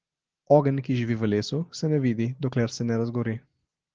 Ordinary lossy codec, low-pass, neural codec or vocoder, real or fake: Opus, 16 kbps; 7.2 kHz; none; real